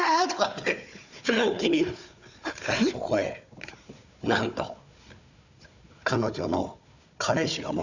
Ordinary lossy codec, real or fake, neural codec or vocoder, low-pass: none; fake; codec, 16 kHz, 4 kbps, FunCodec, trained on Chinese and English, 50 frames a second; 7.2 kHz